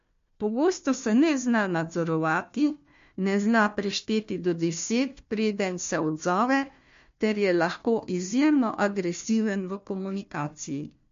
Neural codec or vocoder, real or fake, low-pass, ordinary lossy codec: codec, 16 kHz, 1 kbps, FunCodec, trained on Chinese and English, 50 frames a second; fake; 7.2 kHz; MP3, 48 kbps